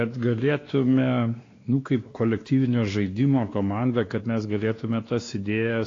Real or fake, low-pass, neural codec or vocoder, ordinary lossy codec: fake; 7.2 kHz; codec, 16 kHz, 2 kbps, X-Codec, WavLM features, trained on Multilingual LibriSpeech; AAC, 32 kbps